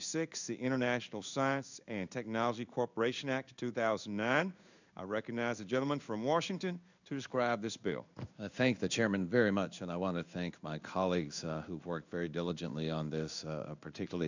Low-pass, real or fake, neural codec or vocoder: 7.2 kHz; fake; codec, 16 kHz in and 24 kHz out, 1 kbps, XY-Tokenizer